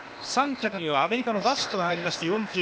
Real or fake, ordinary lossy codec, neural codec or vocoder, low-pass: fake; none; codec, 16 kHz, 0.8 kbps, ZipCodec; none